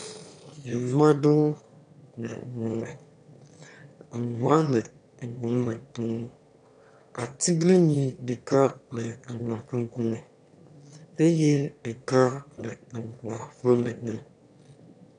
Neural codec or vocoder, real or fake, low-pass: autoencoder, 22.05 kHz, a latent of 192 numbers a frame, VITS, trained on one speaker; fake; 9.9 kHz